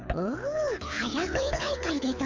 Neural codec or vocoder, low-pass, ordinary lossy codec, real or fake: codec, 24 kHz, 6 kbps, HILCodec; 7.2 kHz; MP3, 64 kbps; fake